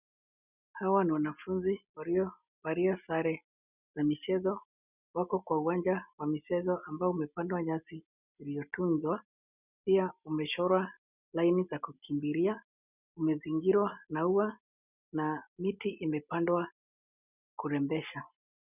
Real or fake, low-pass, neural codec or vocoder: real; 3.6 kHz; none